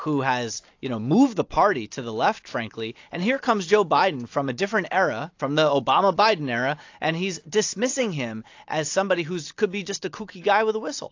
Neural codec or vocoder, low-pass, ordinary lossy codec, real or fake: none; 7.2 kHz; AAC, 48 kbps; real